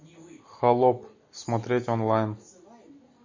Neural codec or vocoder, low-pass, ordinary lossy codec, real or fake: none; 7.2 kHz; MP3, 32 kbps; real